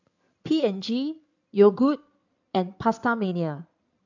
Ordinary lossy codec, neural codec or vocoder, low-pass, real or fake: none; codec, 16 kHz in and 24 kHz out, 2.2 kbps, FireRedTTS-2 codec; 7.2 kHz; fake